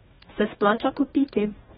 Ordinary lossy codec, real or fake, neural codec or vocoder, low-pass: AAC, 16 kbps; fake; codec, 44.1 kHz, 2.6 kbps, DAC; 19.8 kHz